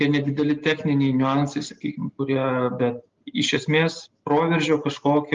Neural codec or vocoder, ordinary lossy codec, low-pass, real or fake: none; Opus, 32 kbps; 7.2 kHz; real